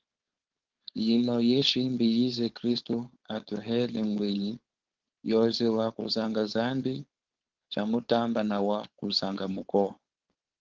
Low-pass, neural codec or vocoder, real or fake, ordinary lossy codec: 7.2 kHz; codec, 16 kHz, 4.8 kbps, FACodec; fake; Opus, 16 kbps